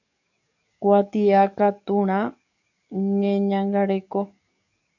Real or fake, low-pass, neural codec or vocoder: fake; 7.2 kHz; codec, 44.1 kHz, 7.8 kbps, DAC